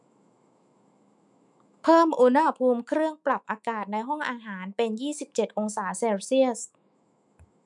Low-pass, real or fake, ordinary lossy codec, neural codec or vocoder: 10.8 kHz; fake; none; autoencoder, 48 kHz, 128 numbers a frame, DAC-VAE, trained on Japanese speech